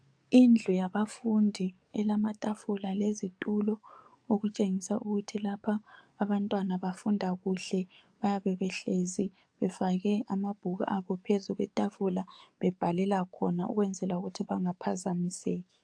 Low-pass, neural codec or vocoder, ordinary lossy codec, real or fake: 9.9 kHz; codec, 44.1 kHz, 7.8 kbps, DAC; AAC, 64 kbps; fake